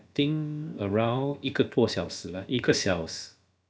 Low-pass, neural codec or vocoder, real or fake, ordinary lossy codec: none; codec, 16 kHz, about 1 kbps, DyCAST, with the encoder's durations; fake; none